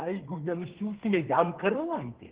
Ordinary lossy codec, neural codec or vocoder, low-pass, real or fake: Opus, 16 kbps; codec, 32 kHz, 1.9 kbps, SNAC; 3.6 kHz; fake